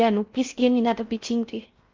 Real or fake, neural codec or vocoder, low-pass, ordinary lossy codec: fake; codec, 16 kHz in and 24 kHz out, 0.6 kbps, FocalCodec, streaming, 4096 codes; 7.2 kHz; Opus, 24 kbps